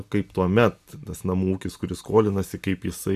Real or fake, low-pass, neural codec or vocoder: real; 14.4 kHz; none